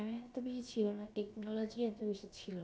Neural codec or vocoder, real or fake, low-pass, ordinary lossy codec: codec, 16 kHz, about 1 kbps, DyCAST, with the encoder's durations; fake; none; none